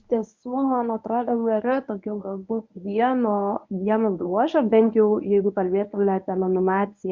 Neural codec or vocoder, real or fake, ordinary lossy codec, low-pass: codec, 24 kHz, 0.9 kbps, WavTokenizer, medium speech release version 1; fake; MP3, 48 kbps; 7.2 kHz